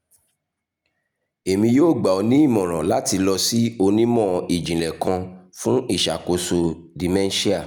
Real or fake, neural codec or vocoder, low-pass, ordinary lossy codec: real; none; 19.8 kHz; none